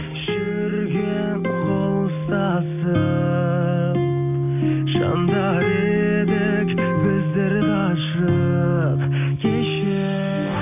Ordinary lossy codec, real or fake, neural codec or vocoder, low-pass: none; real; none; 3.6 kHz